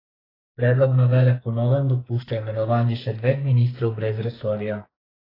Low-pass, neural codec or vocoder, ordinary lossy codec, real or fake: 5.4 kHz; codec, 44.1 kHz, 2.6 kbps, SNAC; AAC, 24 kbps; fake